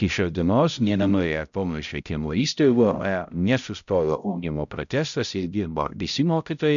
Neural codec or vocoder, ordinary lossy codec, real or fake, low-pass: codec, 16 kHz, 0.5 kbps, X-Codec, HuBERT features, trained on balanced general audio; MP3, 96 kbps; fake; 7.2 kHz